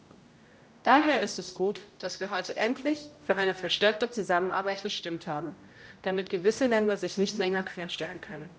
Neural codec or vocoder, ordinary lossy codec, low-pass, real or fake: codec, 16 kHz, 0.5 kbps, X-Codec, HuBERT features, trained on general audio; none; none; fake